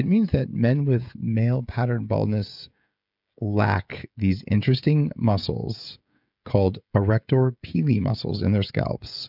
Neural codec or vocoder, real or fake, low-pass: codec, 16 kHz, 16 kbps, FreqCodec, smaller model; fake; 5.4 kHz